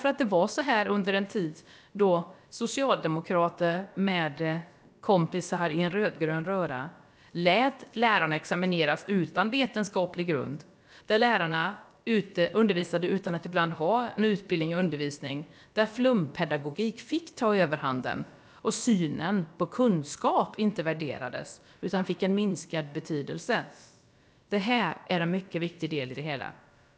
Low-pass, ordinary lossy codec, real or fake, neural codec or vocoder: none; none; fake; codec, 16 kHz, about 1 kbps, DyCAST, with the encoder's durations